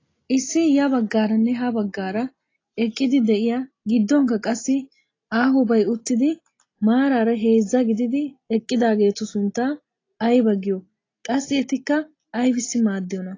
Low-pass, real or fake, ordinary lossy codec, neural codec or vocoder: 7.2 kHz; real; AAC, 32 kbps; none